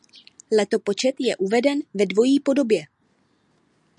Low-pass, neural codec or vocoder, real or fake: 10.8 kHz; none; real